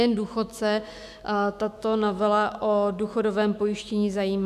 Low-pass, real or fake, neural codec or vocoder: 14.4 kHz; fake; autoencoder, 48 kHz, 128 numbers a frame, DAC-VAE, trained on Japanese speech